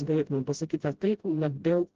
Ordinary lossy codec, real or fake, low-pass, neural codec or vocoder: Opus, 16 kbps; fake; 7.2 kHz; codec, 16 kHz, 0.5 kbps, FreqCodec, smaller model